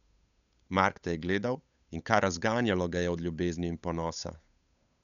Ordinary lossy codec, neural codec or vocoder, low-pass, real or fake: none; codec, 16 kHz, 8 kbps, FunCodec, trained on Chinese and English, 25 frames a second; 7.2 kHz; fake